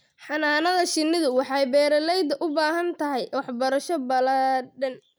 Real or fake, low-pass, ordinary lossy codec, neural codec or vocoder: real; none; none; none